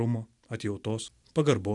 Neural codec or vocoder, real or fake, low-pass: none; real; 10.8 kHz